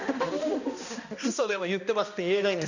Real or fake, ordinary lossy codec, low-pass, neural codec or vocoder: fake; none; 7.2 kHz; codec, 16 kHz, 1 kbps, X-Codec, HuBERT features, trained on balanced general audio